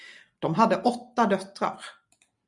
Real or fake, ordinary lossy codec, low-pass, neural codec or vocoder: real; MP3, 96 kbps; 10.8 kHz; none